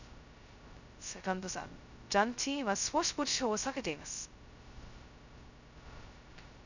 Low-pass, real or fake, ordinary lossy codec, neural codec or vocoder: 7.2 kHz; fake; none; codec, 16 kHz, 0.2 kbps, FocalCodec